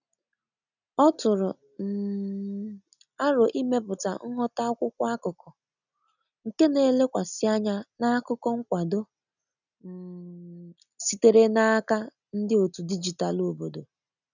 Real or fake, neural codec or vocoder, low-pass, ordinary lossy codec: real; none; 7.2 kHz; none